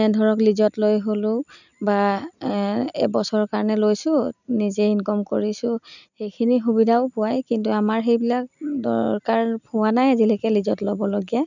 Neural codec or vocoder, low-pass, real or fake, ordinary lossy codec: none; 7.2 kHz; real; none